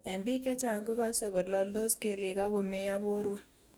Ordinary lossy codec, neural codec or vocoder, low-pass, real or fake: none; codec, 44.1 kHz, 2.6 kbps, DAC; none; fake